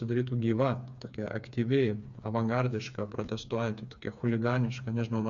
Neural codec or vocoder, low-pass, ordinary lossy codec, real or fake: codec, 16 kHz, 4 kbps, FreqCodec, smaller model; 7.2 kHz; Opus, 64 kbps; fake